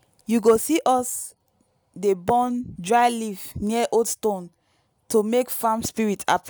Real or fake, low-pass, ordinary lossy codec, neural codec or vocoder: real; none; none; none